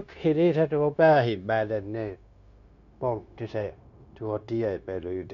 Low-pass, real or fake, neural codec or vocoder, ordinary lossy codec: 7.2 kHz; fake; codec, 16 kHz, 0.9 kbps, LongCat-Audio-Codec; none